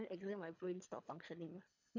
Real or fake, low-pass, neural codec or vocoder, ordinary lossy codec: fake; 7.2 kHz; codec, 24 kHz, 3 kbps, HILCodec; none